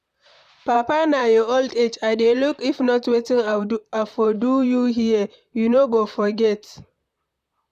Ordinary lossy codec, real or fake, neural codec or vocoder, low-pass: none; fake; vocoder, 44.1 kHz, 128 mel bands, Pupu-Vocoder; 14.4 kHz